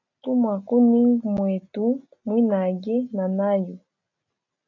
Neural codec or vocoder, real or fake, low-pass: none; real; 7.2 kHz